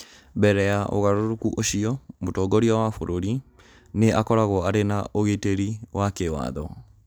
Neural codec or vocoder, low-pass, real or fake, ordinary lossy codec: none; none; real; none